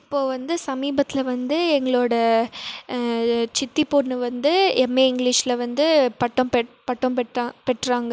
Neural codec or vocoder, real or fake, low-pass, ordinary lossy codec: none; real; none; none